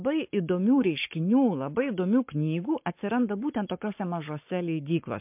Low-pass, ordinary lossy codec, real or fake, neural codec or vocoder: 3.6 kHz; MP3, 32 kbps; fake; codec, 44.1 kHz, 7.8 kbps, Pupu-Codec